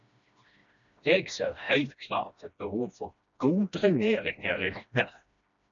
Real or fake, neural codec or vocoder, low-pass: fake; codec, 16 kHz, 1 kbps, FreqCodec, smaller model; 7.2 kHz